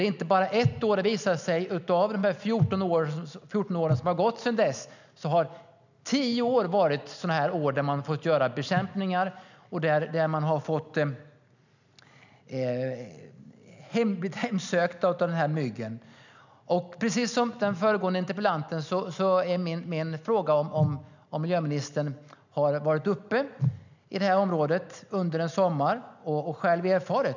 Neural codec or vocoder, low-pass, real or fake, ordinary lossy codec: none; 7.2 kHz; real; none